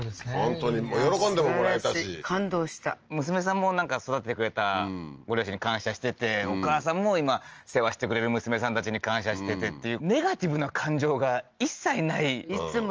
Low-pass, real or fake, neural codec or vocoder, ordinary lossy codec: 7.2 kHz; real; none; Opus, 24 kbps